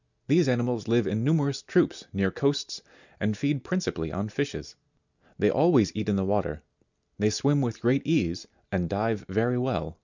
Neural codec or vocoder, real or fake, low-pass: none; real; 7.2 kHz